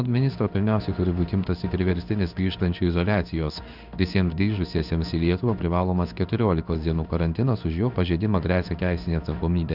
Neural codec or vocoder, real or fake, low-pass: codec, 16 kHz in and 24 kHz out, 1 kbps, XY-Tokenizer; fake; 5.4 kHz